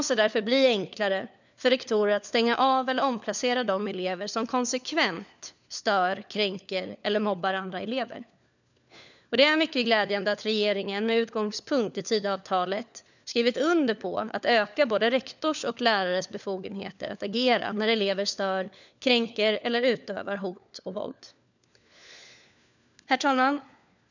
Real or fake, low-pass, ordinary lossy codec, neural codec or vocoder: fake; 7.2 kHz; none; codec, 16 kHz, 4 kbps, FunCodec, trained on LibriTTS, 50 frames a second